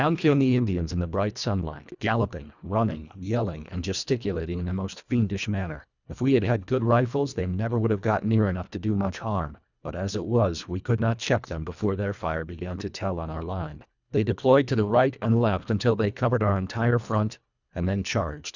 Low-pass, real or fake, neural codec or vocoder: 7.2 kHz; fake; codec, 24 kHz, 1.5 kbps, HILCodec